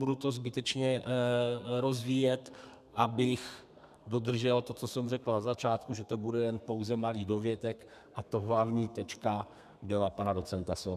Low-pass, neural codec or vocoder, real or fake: 14.4 kHz; codec, 32 kHz, 1.9 kbps, SNAC; fake